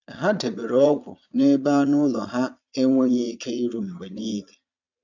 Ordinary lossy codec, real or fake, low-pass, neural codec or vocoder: none; fake; 7.2 kHz; vocoder, 22.05 kHz, 80 mel bands, Vocos